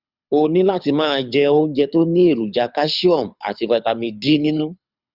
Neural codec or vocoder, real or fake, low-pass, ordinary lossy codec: codec, 24 kHz, 6 kbps, HILCodec; fake; 5.4 kHz; Opus, 64 kbps